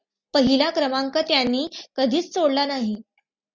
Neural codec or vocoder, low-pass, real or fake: none; 7.2 kHz; real